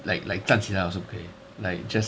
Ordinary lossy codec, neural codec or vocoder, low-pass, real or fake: none; none; none; real